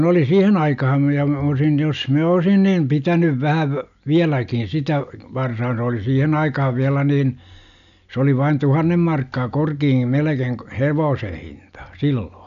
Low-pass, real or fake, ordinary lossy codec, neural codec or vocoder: 7.2 kHz; real; none; none